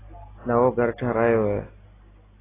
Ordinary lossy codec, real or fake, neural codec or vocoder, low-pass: AAC, 16 kbps; real; none; 3.6 kHz